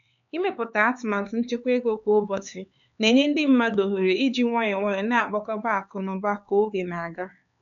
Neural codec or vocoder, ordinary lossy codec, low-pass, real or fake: codec, 16 kHz, 4 kbps, X-Codec, HuBERT features, trained on LibriSpeech; none; 7.2 kHz; fake